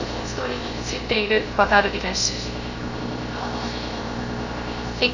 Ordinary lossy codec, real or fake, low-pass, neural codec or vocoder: none; fake; 7.2 kHz; codec, 16 kHz, 0.3 kbps, FocalCodec